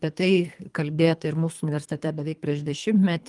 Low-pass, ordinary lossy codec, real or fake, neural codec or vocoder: 10.8 kHz; Opus, 32 kbps; fake; codec, 24 kHz, 3 kbps, HILCodec